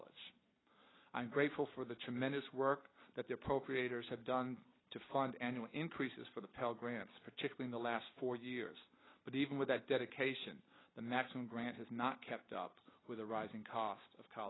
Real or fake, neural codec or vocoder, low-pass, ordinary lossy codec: real; none; 7.2 kHz; AAC, 16 kbps